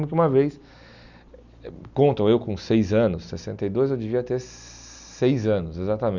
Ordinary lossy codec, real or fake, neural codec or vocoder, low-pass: none; real; none; 7.2 kHz